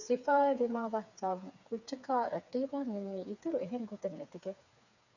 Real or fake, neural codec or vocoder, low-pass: fake; codec, 16 kHz, 4 kbps, FreqCodec, smaller model; 7.2 kHz